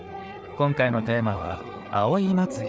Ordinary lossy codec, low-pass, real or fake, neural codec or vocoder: none; none; fake; codec, 16 kHz, 4 kbps, FreqCodec, larger model